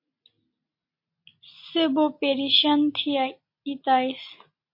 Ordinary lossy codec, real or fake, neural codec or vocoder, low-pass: MP3, 32 kbps; real; none; 5.4 kHz